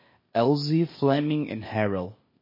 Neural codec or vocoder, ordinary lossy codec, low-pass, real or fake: codec, 16 kHz, 0.8 kbps, ZipCodec; MP3, 24 kbps; 5.4 kHz; fake